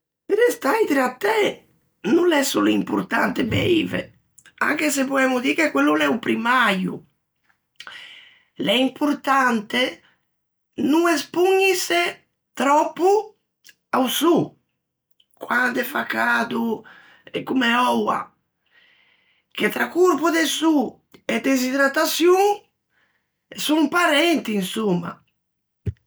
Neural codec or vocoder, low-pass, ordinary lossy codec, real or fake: none; none; none; real